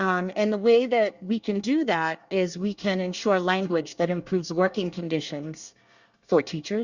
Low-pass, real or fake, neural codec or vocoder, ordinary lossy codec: 7.2 kHz; fake; codec, 24 kHz, 1 kbps, SNAC; Opus, 64 kbps